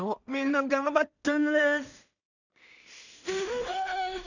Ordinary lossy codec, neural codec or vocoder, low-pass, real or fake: none; codec, 16 kHz in and 24 kHz out, 0.4 kbps, LongCat-Audio-Codec, two codebook decoder; 7.2 kHz; fake